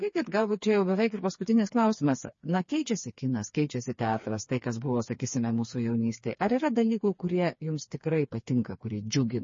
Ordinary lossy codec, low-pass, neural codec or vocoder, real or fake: MP3, 32 kbps; 7.2 kHz; codec, 16 kHz, 4 kbps, FreqCodec, smaller model; fake